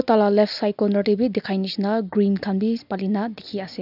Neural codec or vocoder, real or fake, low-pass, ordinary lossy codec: none; real; 5.4 kHz; none